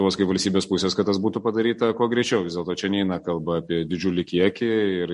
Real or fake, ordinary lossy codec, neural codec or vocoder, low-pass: real; MP3, 48 kbps; none; 14.4 kHz